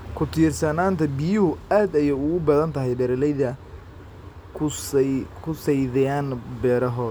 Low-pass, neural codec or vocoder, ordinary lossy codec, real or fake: none; none; none; real